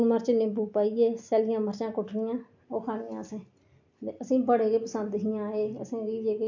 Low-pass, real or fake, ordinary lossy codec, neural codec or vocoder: 7.2 kHz; real; MP3, 48 kbps; none